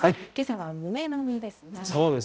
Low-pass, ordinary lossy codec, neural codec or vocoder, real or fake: none; none; codec, 16 kHz, 0.5 kbps, X-Codec, HuBERT features, trained on balanced general audio; fake